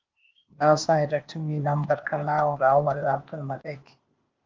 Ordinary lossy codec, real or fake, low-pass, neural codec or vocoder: Opus, 24 kbps; fake; 7.2 kHz; codec, 16 kHz, 0.8 kbps, ZipCodec